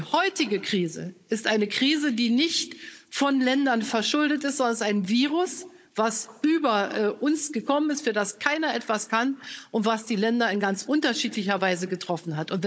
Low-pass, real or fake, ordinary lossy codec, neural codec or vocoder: none; fake; none; codec, 16 kHz, 16 kbps, FunCodec, trained on Chinese and English, 50 frames a second